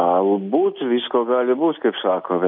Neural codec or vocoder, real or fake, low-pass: none; real; 5.4 kHz